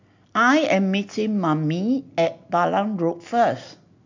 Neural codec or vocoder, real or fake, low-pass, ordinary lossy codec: none; real; 7.2 kHz; MP3, 64 kbps